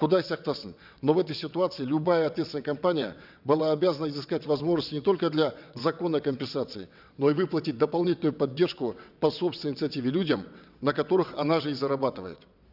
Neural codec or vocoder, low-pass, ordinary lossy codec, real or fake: vocoder, 22.05 kHz, 80 mel bands, WaveNeXt; 5.4 kHz; none; fake